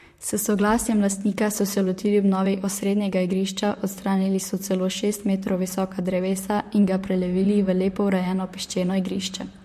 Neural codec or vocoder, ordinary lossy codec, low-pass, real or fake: vocoder, 44.1 kHz, 128 mel bands, Pupu-Vocoder; MP3, 64 kbps; 14.4 kHz; fake